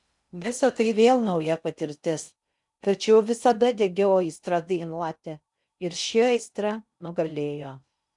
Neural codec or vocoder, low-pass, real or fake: codec, 16 kHz in and 24 kHz out, 0.6 kbps, FocalCodec, streaming, 2048 codes; 10.8 kHz; fake